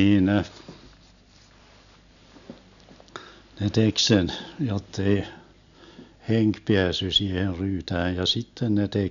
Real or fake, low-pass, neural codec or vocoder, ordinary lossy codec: real; 7.2 kHz; none; none